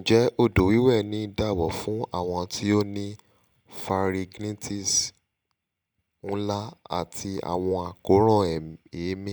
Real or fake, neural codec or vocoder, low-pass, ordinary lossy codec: real; none; none; none